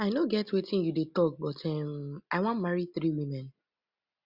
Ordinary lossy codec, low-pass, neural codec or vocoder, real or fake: Opus, 64 kbps; 5.4 kHz; none; real